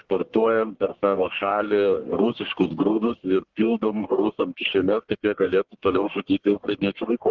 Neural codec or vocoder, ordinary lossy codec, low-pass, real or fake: codec, 44.1 kHz, 1.7 kbps, Pupu-Codec; Opus, 16 kbps; 7.2 kHz; fake